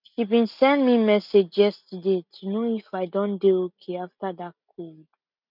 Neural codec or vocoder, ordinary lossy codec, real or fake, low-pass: none; none; real; 5.4 kHz